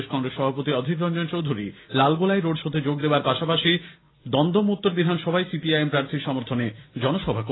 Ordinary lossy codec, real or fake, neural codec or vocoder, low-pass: AAC, 16 kbps; real; none; 7.2 kHz